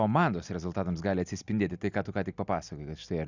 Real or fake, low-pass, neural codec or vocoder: real; 7.2 kHz; none